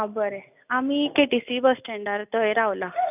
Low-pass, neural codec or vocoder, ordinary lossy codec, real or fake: 3.6 kHz; none; none; real